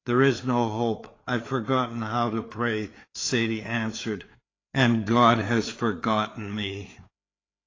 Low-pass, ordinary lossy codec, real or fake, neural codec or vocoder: 7.2 kHz; AAC, 32 kbps; fake; codec, 16 kHz, 4 kbps, FreqCodec, larger model